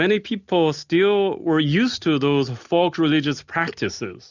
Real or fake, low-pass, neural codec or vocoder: real; 7.2 kHz; none